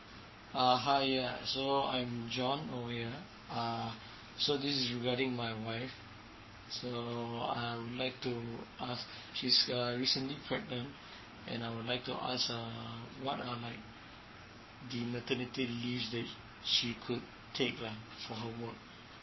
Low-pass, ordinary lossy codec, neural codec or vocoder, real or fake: 7.2 kHz; MP3, 24 kbps; codec, 44.1 kHz, 7.8 kbps, Pupu-Codec; fake